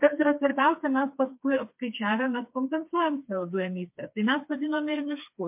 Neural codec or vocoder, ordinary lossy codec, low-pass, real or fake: codec, 16 kHz, 4 kbps, FreqCodec, smaller model; MP3, 32 kbps; 3.6 kHz; fake